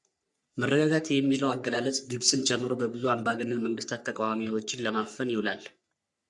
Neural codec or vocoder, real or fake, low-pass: codec, 44.1 kHz, 3.4 kbps, Pupu-Codec; fake; 10.8 kHz